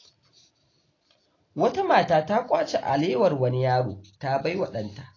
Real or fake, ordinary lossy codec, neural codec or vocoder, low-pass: real; AAC, 32 kbps; none; 7.2 kHz